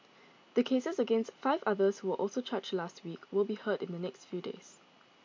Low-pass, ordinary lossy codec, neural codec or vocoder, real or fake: 7.2 kHz; MP3, 48 kbps; none; real